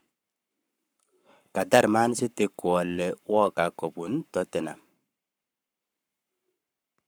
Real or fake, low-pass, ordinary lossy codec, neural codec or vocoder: fake; none; none; codec, 44.1 kHz, 7.8 kbps, Pupu-Codec